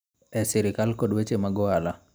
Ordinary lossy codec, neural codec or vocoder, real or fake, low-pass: none; none; real; none